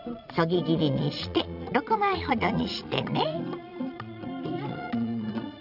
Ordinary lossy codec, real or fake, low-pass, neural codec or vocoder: none; fake; 5.4 kHz; vocoder, 22.05 kHz, 80 mel bands, Vocos